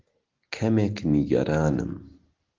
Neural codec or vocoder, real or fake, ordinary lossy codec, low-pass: none; real; Opus, 16 kbps; 7.2 kHz